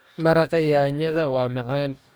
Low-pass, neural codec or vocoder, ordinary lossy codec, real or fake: none; codec, 44.1 kHz, 2.6 kbps, DAC; none; fake